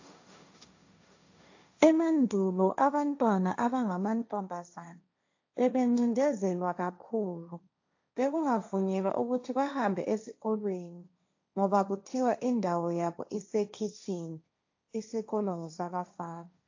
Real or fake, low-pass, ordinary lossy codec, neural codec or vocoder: fake; 7.2 kHz; AAC, 48 kbps; codec, 16 kHz, 1.1 kbps, Voila-Tokenizer